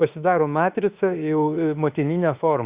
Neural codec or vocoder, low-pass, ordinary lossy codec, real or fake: autoencoder, 48 kHz, 32 numbers a frame, DAC-VAE, trained on Japanese speech; 3.6 kHz; Opus, 64 kbps; fake